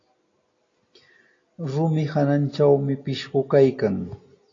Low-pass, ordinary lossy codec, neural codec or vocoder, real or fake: 7.2 kHz; AAC, 32 kbps; none; real